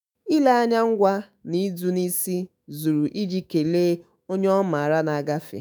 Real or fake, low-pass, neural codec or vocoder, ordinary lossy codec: fake; none; autoencoder, 48 kHz, 128 numbers a frame, DAC-VAE, trained on Japanese speech; none